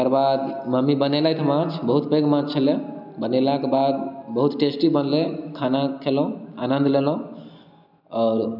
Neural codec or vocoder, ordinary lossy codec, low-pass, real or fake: none; none; 5.4 kHz; real